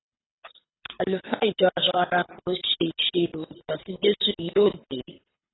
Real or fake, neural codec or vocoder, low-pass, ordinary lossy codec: fake; codec, 24 kHz, 6 kbps, HILCodec; 7.2 kHz; AAC, 16 kbps